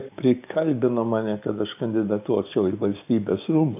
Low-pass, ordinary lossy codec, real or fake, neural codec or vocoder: 3.6 kHz; AAC, 32 kbps; fake; codec, 16 kHz, 6 kbps, DAC